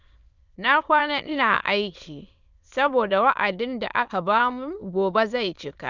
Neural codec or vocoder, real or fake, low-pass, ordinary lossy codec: autoencoder, 22.05 kHz, a latent of 192 numbers a frame, VITS, trained on many speakers; fake; 7.2 kHz; none